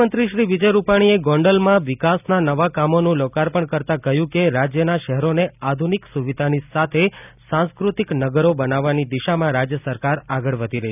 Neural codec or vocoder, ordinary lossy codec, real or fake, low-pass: none; none; real; 3.6 kHz